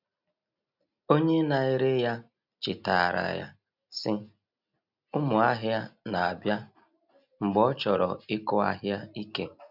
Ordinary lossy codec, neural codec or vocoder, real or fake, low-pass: none; none; real; 5.4 kHz